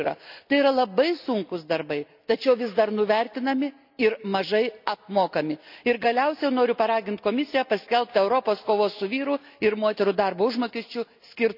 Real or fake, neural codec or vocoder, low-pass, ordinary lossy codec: real; none; 5.4 kHz; none